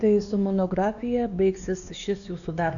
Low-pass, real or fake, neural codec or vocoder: 7.2 kHz; fake; codec, 16 kHz, 2 kbps, X-Codec, WavLM features, trained on Multilingual LibriSpeech